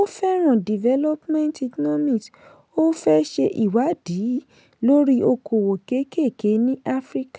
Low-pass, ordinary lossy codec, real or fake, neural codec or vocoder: none; none; real; none